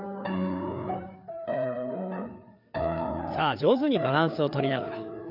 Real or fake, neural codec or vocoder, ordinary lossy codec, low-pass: fake; codec, 16 kHz, 4 kbps, FreqCodec, larger model; none; 5.4 kHz